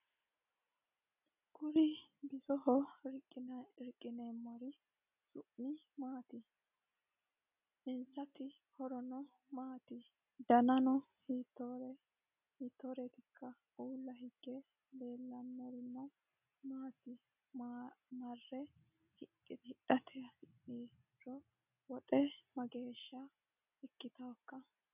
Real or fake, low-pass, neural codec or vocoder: real; 3.6 kHz; none